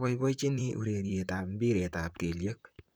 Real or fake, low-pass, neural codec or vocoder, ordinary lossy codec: fake; none; vocoder, 44.1 kHz, 128 mel bands, Pupu-Vocoder; none